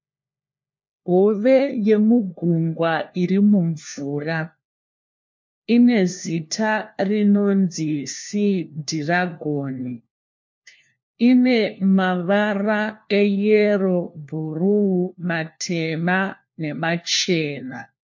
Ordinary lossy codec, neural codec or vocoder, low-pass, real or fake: MP3, 48 kbps; codec, 16 kHz, 1 kbps, FunCodec, trained on LibriTTS, 50 frames a second; 7.2 kHz; fake